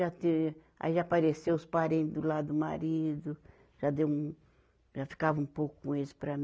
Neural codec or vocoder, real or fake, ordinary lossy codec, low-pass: none; real; none; none